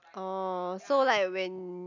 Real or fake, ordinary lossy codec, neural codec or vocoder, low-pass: real; none; none; 7.2 kHz